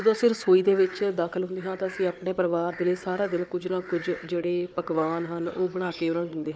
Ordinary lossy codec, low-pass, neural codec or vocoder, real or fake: none; none; codec, 16 kHz, 4 kbps, FunCodec, trained on Chinese and English, 50 frames a second; fake